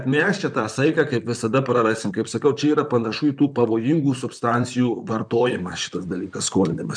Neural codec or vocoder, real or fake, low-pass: vocoder, 44.1 kHz, 128 mel bands, Pupu-Vocoder; fake; 9.9 kHz